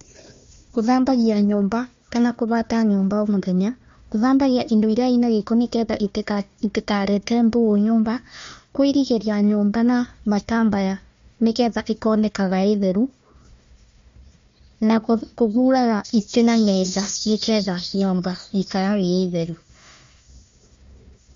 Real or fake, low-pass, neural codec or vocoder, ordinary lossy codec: fake; 7.2 kHz; codec, 16 kHz, 1 kbps, FunCodec, trained on Chinese and English, 50 frames a second; MP3, 48 kbps